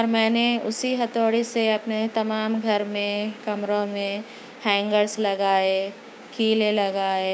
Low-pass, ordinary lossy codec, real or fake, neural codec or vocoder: none; none; fake; codec, 16 kHz, 6 kbps, DAC